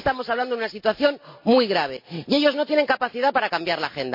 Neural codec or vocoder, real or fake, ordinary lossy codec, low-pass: none; real; MP3, 32 kbps; 5.4 kHz